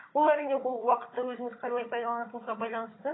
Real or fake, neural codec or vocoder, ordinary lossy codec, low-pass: fake; codec, 16 kHz, 16 kbps, FunCodec, trained on Chinese and English, 50 frames a second; AAC, 16 kbps; 7.2 kHz